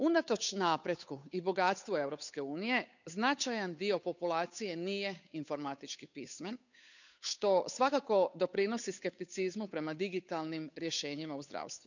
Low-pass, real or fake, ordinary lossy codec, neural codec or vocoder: 7.2 kHz; fake; none; codec, 16 kHz, 8 kbps, FunCodec, trained on Chinese and English, 25 frames a second